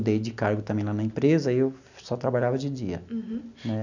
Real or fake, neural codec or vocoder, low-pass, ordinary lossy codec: real; none; 7.2 kHz; none